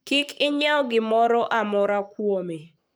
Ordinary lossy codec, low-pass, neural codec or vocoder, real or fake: none; none; codec, 44.1 kHz, 7.8 kbps, Pupu-Codec; fake